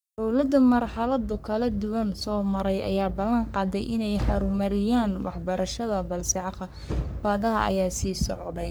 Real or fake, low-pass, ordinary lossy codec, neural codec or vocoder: fake; none; none; codec, 44.1 kHz, 3.4 kbps, Pupu-Codec